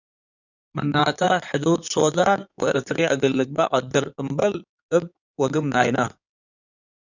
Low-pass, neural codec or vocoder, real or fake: 7.2 kHz; codec, 16 kHz in and 24 kHz out, 2.2 kbps, FireRedTTS-2 codec; fake